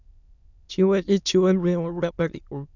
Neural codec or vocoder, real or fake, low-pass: autoencoder, 22.05 kHz, a latent of 192 numbers a frame, VITS, trained on many speakers; fake; 7.2 kHz